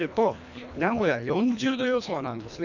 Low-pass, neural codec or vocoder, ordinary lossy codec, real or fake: 7.2 kHz; codec, 24 kHz, 1.5 kbps, HILCodec; none; fake